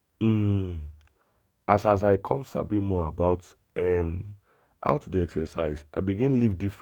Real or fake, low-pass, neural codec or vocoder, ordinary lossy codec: fake; 19.8 kHz; codec, 44.1 kHz, 2.6 kbps, DAC; none